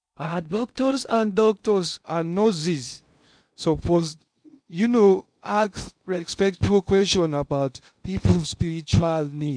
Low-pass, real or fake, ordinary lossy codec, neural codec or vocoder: 9.9 kHz; fake; none; codec, 16 kHz in and 24 kHz out, 0.6 kbps, FocalCodec, streaming, 2048 codes